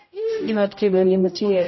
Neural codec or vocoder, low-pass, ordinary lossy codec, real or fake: codec, 16 kHz, 0.5 kbps, X-Codec, HuBERT features, trained on general audio; 7.2 kHz; MP3, 24 kbps; fake